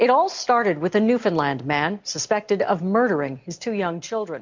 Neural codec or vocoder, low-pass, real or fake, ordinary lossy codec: none; 7.2 kHz; real; MP3, 64 kbps